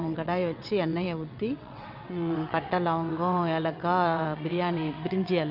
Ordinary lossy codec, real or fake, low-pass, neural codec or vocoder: none; fake; 5.4 kHz; vocoder, 22.05 kHz, 80 mel bands, WaveNeXt